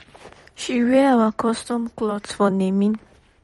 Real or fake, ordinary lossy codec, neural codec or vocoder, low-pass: fake; MP3, 48 kbps; vocoder, 44.1 kHz, 128 mel bands every 256 samples, BigVGAN v2; 19.8 kHz